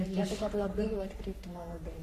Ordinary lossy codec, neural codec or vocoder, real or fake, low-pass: AAC, 48 kbps; codec, 44.1 kHz, 3.4 kbps, Pupu-Codec; fake; 14.4 kHz